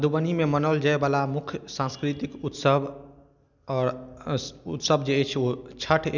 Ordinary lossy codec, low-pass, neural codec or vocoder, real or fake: none; 7.2 kHz; none; real